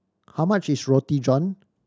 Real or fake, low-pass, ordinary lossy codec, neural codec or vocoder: real; none; none; none